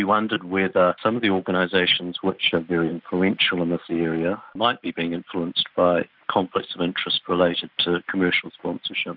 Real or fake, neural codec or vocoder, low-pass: real; none; 5.4 kHz